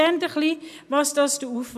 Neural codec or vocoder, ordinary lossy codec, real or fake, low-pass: none; none; real; 14.4 kHz